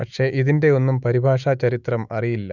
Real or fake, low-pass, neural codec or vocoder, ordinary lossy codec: fake; 7.2 kHz; autoencoder, 48 kHz, 128 numbers a frame, DAC-VAE, trained on Japanese speech; none